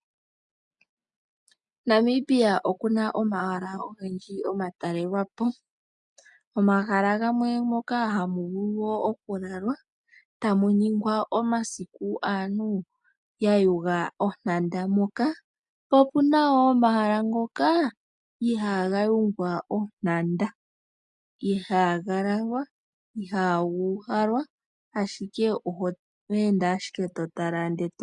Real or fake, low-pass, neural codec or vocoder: real; 10.8 kHz; none